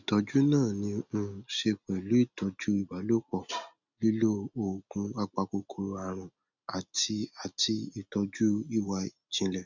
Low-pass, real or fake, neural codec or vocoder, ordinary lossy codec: 7.2 kHz; real; none; none